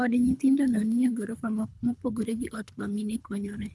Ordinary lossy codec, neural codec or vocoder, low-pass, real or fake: none; codec, 24 kHz, 3 kbps, HILCodec; none; fake